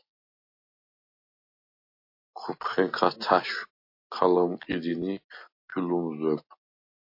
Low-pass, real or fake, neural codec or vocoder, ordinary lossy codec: 5.4 kHz; real; none; MP3, 32 kbps